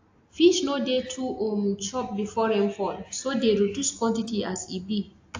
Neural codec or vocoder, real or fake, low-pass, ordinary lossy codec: none; real; 7.2 kHz; AAC, 48 kbps